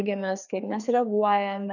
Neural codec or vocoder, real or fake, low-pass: codec, 16 kHz, 2 kbps, FreqCodec, larger model; fake; 7.2 kHz